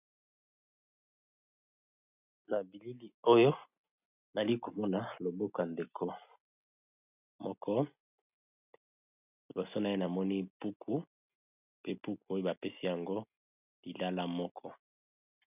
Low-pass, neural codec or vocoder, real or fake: 3.6 kHz; none; real